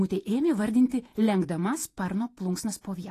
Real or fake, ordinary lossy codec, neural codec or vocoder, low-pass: fake; AAC, 48 kbps; vocoder, 48 kHz, 128 mel bands, Vocos; 14.4 kHz